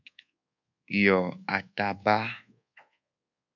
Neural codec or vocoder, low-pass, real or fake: codec, 24 kHz, 1.2 kbps, DualCodec; 7.2 kHz; fake